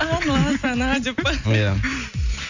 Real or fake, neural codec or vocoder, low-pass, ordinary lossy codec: real; none; 7.2 kHz; none